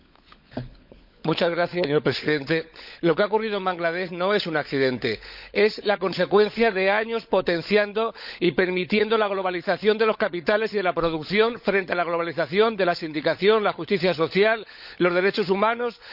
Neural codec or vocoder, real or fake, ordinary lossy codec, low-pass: codec, 16 kHz, 16 kbps, FunCodec, trained on LibriTTS, 50 frames a second; fake; none; 5.4 kHz